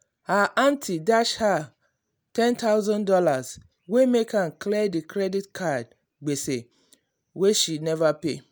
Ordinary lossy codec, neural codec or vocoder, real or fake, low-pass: none; none; real; none